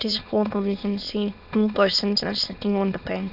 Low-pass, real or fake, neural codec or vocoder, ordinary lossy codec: 5.4 kHz; fake; autoencoder, 22.05 kHz, a latent of 192 numbers a frame, VITS, trained on many speakers; none